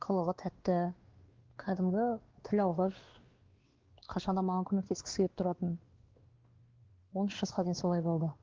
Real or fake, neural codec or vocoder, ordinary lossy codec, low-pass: fake; codec, 16 kHz, 2 kbps, X-Codec, WavLM features, trained on Multilingual LibriSpeech; Opus, 16 kbps; 7.2 kHz